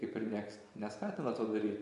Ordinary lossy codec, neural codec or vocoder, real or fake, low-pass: AAC, 64 kbps; vocoder, 24 kHz, 100 mel bands, Vocos; fake; 10.8 kHz